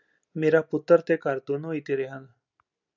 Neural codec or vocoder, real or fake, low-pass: none; real; 7.2 kHz